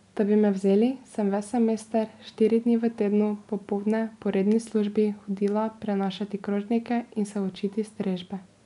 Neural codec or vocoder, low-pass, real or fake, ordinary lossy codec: none; 10.8 kHz; real; none